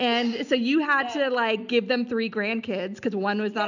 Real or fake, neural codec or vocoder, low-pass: real; none; 7.2 kHz